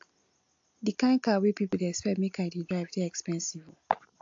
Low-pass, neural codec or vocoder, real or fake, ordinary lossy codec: 7.2 kHz; none; real; AAC, 48 kbps